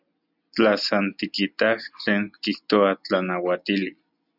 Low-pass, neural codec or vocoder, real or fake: 5.4 kHz; none; real